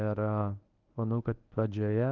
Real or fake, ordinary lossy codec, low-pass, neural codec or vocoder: fake; Opus, 24 kbps; 7.2 kHz; codec, 24 kHz, 0.9 kbps, WavTokenizer, medium speech release version 1